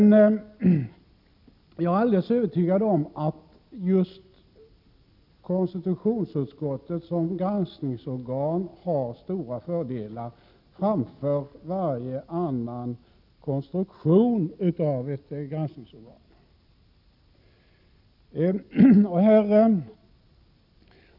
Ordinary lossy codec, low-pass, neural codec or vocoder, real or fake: none; 5.4 kHz; none; real